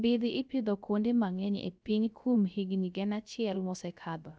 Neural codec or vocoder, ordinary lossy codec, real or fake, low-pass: codec, 16 kHz, 0.3 kbps, FocalCodec; none; fake; none